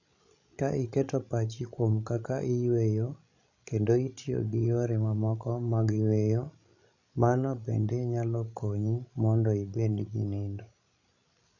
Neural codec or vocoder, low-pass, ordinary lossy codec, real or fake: codec, 16 kHz, 16 kbps, FreqCodec, larger model; 7.2 kHz; none; fake